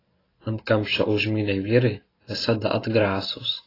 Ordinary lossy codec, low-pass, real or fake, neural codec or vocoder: AAC, 24 kbps; 5.4 kHz; real; none